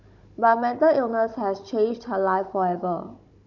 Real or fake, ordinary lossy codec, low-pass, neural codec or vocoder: fake; none; 7.2 kHz; codec, 16 kHz, 16 kbps, FunCodec, trained on Chinese and English, 50 frames a second